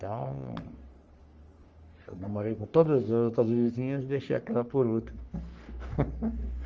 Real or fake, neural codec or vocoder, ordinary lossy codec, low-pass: fake; codec, 44.1 kHz, 3.4 kbps, Pupu-Codec; Opus, 24 kbps; 7.2 kHz